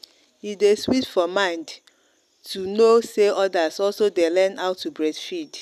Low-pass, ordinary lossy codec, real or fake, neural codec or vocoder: 14.4 kHz; none; real; none